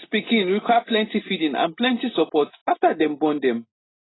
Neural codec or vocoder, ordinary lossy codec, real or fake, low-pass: none; AAC, 16 kbps; real; 7.2 kHz